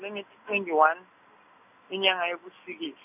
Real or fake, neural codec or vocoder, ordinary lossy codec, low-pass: real; none; none; 3.6 kHz